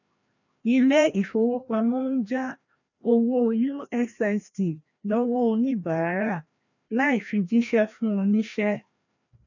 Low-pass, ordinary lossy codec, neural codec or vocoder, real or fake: 7.2 kHz; none; codec, 16 kHz, 1 kbps, FreqCodec, larger model; fake